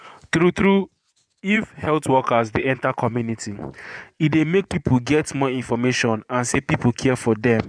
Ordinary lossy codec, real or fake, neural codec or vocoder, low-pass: none; fake; vocoder, 24 kHz, 100 mel bands, Vocos; 9.9 kHz